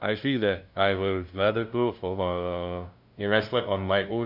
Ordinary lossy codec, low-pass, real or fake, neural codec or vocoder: none; 5.4 kHz; fake; codec, 16 kHz, 0.5 kbps, FunCodec, trained on LibriTTS, 25 frames a second